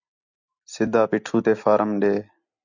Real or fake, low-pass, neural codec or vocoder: real; 7.2 kHz; none